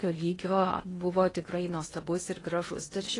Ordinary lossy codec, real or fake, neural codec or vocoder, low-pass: AAC, 32 kbps; fake; codec, 16 kHz in and 24 kHz out, 0.8 kbps, FocalCodec, streaming, 65536 codes; 10.8 kHz